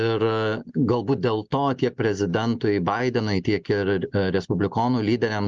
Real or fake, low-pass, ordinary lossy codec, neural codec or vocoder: real; 7.2 kHz; Opus, 24 kbps; none